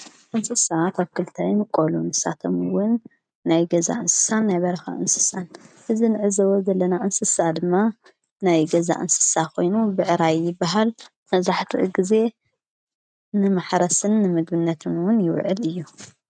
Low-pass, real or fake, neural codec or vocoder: 9.9 kHz; real; none